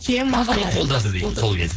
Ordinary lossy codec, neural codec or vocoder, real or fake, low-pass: none; codec, 16 kHz, 4.8 kbps, FACodec; fake; none